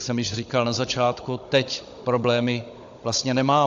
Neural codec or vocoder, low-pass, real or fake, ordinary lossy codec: codec, 16 kHz, 16 kbps, FunCodec, trained on Chinese and English, 50 frames a second; 7.2 kHz; fake; AAC, 64 kbps